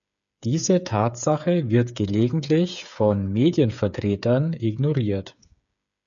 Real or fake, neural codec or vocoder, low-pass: fake; codec, 16 kHz, 8 kbps, FreqCodec, smaller model; 7.2 kHz